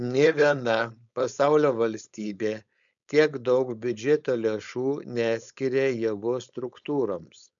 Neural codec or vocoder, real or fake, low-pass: codec, 16 kHz, 4.8 kbps, FACodec; fake; 7.2 kHz